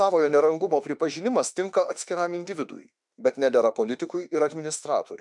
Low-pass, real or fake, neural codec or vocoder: 10.8 kHz; fake; autoencoder, 48 kHz, 32 numbers a frame, DAC-VAE, trained on Japanese speech